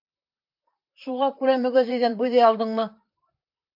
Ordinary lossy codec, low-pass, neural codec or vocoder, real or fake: AAC, 48 kbps; 5.4 kHz; vocoder, 44.1 kHz, 128 mel bands, Pupu-Vocoder; fake